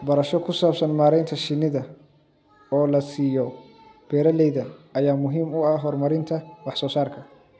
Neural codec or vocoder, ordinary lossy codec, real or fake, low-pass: none; none; real; none